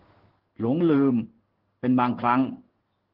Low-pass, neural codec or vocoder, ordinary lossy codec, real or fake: 5.4 kHz; codec, 16 kHz in and 24 kHz out, 1 kbps, XY-Tokenizer; Opus, 16 kbps; fake